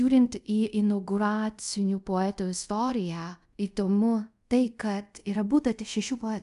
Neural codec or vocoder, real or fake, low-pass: codec, 24 kHz, 0.5 kbps, DualCodec; fake; 10.8 kHz